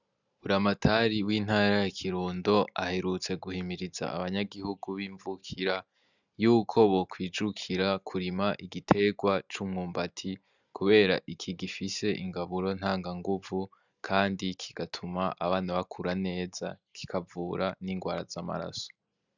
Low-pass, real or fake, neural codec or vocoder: 7.2 kHz; real; none